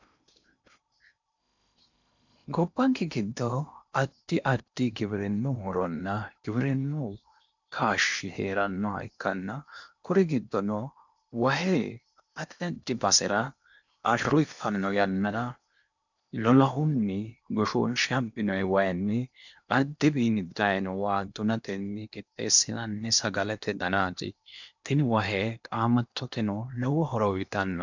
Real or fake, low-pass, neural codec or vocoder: fake; 7.2 kHz; codec, 16 kHz in and 24 kHz out, 0.8 kbps, FocalCodec, streaming, 65536 codes